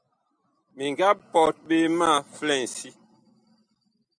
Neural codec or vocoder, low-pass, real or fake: none; 9.9 kHz; real